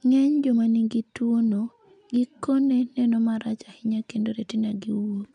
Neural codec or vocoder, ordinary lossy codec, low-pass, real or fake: none; none; 9.9 kHz; real